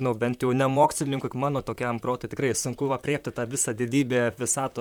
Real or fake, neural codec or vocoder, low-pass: fake; vocoder, 44.1 kHz, 128 mel bands, Pupu-Vocoder; 19.8 kHz